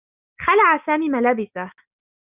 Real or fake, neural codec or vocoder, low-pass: real; none; 3.6 kHz